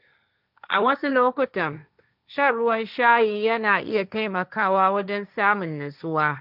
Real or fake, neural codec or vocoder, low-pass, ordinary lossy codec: fake; codec, 16 kHz, 1.1 kbps, Voila-Tokenizer; 5.4 kHz; none